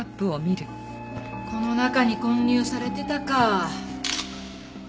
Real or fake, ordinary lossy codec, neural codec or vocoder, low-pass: real; none; none; none